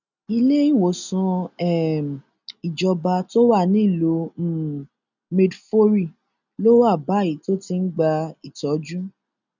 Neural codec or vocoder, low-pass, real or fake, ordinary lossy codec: none; 7.2 kHz; real; none